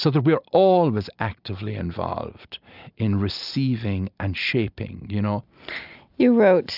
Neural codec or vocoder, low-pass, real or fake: none; 5.4 kHz; real